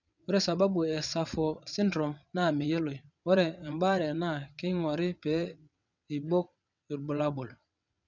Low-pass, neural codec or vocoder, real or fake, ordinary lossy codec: 7.2 kHz; vocoder, 22.05 kHz, 80 mel bands, WaveNeXt; fake; none